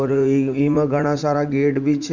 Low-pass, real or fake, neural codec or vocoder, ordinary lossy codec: 7.2 kHz; fake; vocoder, 44.1 kHz, 80 mel bands, Vocos; Opus, 64 kbps